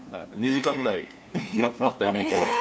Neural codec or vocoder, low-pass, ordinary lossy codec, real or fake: codec, 16 kHz, 2 kbps, FunCodec, trained on LibriTTS, 25 frames a second; none; none; fake